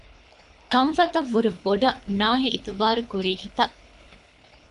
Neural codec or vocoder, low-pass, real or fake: codec, 24 kHz, 3 kbps, HILCodec; 10.8 kHz; fake